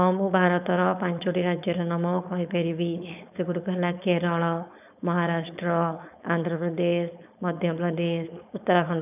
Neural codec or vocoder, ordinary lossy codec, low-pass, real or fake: codec, 16 kHz, 4.8 kbps, FACodec; none; 3.6 kHz; fake